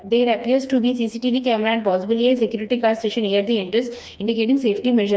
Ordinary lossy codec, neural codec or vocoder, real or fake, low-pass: none; codec, 16 kHz, 2 kbps, FreqCodec, smaller model; fake; none